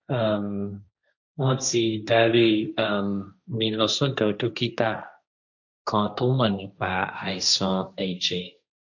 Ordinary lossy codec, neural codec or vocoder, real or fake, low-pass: none; codec, 16 kHz, 1.1 kbps, Voila-Tokenizer; fake; 7.2 kHz